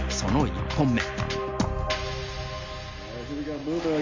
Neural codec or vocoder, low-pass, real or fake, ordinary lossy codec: none; 7.2 kHz; real; MP3, 48 kbps